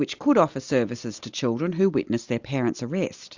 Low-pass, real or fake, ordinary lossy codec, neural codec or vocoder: 7.2 kHz; real; Opus, 64 kbps; none